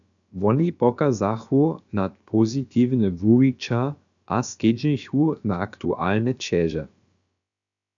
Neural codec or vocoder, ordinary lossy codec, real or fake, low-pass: codec, 16 kHz, about 1 kbps, DyCAST, with the encoder's durations; MP3, 96 kbps; fake; 7.2 kHz